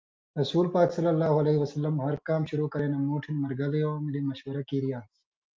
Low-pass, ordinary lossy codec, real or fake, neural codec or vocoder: 7.2 kHz; Opus, 32 kbps; real; none